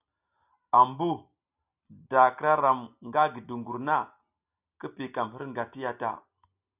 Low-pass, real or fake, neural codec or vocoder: 3.6 kHz; real; none